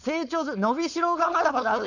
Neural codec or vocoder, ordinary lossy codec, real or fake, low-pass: codec, 16 kHz, 4.8 kbps, FACodec; none; fake; 7.2 kHz